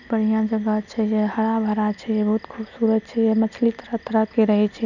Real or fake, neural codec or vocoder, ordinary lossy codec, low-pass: real; none; none; 7.2 kHz